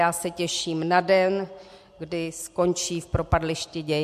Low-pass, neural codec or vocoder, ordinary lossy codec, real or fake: 14.4 kHz; none; MP3, 64 kbps; real